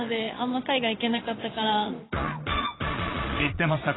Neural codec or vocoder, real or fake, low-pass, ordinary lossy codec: vocoder, 22.05 kHz, 80 mel bands, Vocos; fake; 7.2 kHz; AAC, 16 kbps